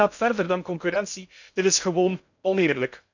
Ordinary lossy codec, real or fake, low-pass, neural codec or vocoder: none; fake; 7.2 kHz; codec, 16 kHz in and 24 kHz out, 0.6 kbps, FocalCodec, streaming, 2048 codes